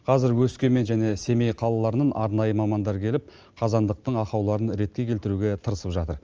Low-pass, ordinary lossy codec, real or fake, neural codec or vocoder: 7.2 kHz; Opus, 24 kbps; real; none